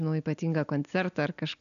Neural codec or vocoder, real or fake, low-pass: none; real; 7.2 kHz